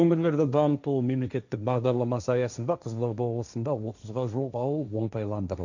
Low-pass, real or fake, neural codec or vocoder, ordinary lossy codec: 7.2 kHz; fake; codec, 16 kHz, 1.1 kbps, Voila-Tokenizer; none